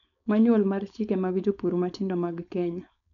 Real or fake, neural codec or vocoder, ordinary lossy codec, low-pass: fake; codec, 16 kHz, 4.8 kbps, FACodec; none; 7.2 kHz